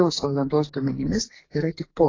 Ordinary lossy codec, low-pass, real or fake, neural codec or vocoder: AAC, 32 kbps; 7.2 kHz; fake; codec, 44.1 kHz, 2.6 kbps, SNAC